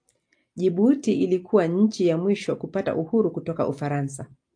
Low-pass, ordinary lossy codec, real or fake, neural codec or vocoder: 9.9 kHz; AAC, 64 kbps; real; none